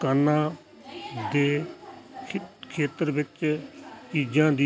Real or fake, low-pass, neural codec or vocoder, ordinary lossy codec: real; none; none; none